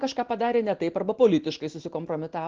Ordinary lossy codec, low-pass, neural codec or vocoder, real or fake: Opus, 16 kbps; 7.2 kHz; none; real